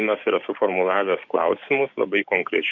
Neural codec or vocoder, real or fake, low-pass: codec, 16 kHz, 6 kbps, DAC; fake; 7.2 kHz